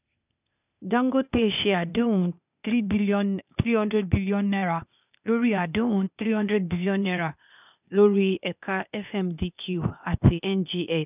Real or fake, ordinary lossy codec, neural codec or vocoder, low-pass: fake; none; codec, 16 kHz, 0.8 kbps, ZipCodec; 3.6 kHz